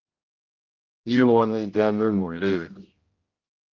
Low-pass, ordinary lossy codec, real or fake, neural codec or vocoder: 7.2 kHz; Opus, 32 kbps; fake; codec, 16 kHz, 0.5 kbps, X-Codec, HuBERT features, trained on general audio